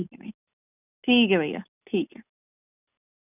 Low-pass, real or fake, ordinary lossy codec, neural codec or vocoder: 3.6 kHz; real; none; none